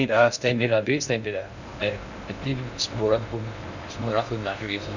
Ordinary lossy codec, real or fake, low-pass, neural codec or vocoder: none; fake; 7.2 kHz; codec, 16 kHz in and 24 kHz out, 0.6 kbps, FocalCodec, streaming, 2048 codes